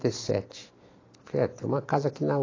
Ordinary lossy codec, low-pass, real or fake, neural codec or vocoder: AAC, 32 kbps; 7.2 kHz; real; none